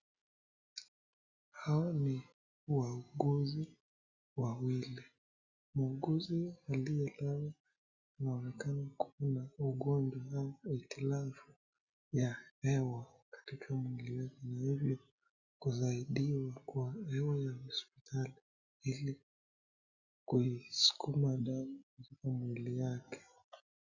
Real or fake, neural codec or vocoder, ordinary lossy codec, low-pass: real; none; Opus, 64 kbps; 7.2 kHz